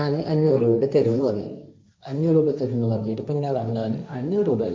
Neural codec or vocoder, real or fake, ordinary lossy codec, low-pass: codec, 16 kHz, 1.1 kbps, Voila-Tokenizer; fake; none; none